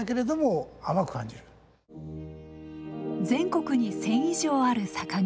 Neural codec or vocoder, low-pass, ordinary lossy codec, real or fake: none; none; none; real